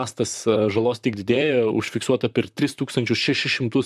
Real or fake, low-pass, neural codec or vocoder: fake; 14.4 kHz; vocoder, 44.1 kHz, 128 mel bands, Pupu-Vocoder